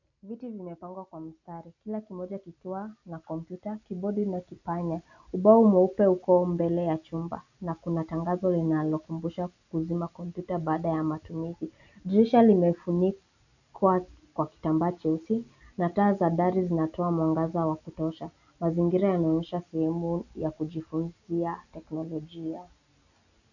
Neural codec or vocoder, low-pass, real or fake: none; 7.2 kHz; real